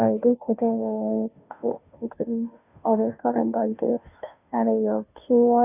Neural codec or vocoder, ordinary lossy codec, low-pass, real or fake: codec, 16 kHz, 0.5 kbps, FunCodec, trained on Chinese and English, 25 frames a second; none; 3.6 kHz; fake